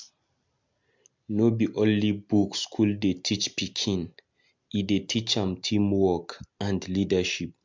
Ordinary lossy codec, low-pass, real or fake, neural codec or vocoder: MP3, 64 kbps; 7.2 kHz; real; none